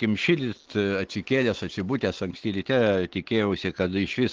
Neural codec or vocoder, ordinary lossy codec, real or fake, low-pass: none; Opus, 16 kbps; real; 7.2 kHz